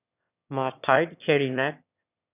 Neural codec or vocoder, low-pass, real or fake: autoencoder, 22.05 kHz, a latent of 192 numbers a frame, VITS, trained on one speaker; 3.6 kHz; fake